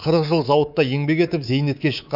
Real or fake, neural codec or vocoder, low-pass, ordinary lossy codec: fake; codec, 24 kHz, 3.1 kbps, DualCodec; 5.4 kHz; none